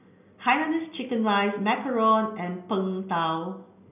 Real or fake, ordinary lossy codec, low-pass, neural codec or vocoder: real; none; 3.6 kHz; none